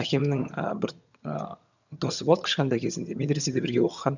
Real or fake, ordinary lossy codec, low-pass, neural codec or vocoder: fake; none; 7.2 kHz; vocoder, 22.05 kHz, 80 mel bands, HiFi-GAN